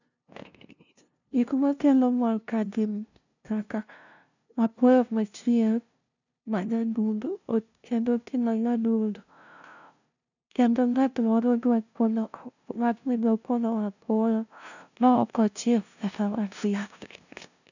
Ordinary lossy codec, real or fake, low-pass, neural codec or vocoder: none; fake; 7.2 kHz; codec, 16 kHz, 0.5 kbps, FunCodec, trained on LibriTTS, 25 frames a second